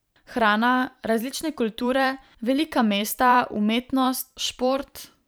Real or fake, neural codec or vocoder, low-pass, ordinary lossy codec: fake; vocoder, 44.1 kHz, 128 mel bands every 512 samples, BigVGAN v2; none; none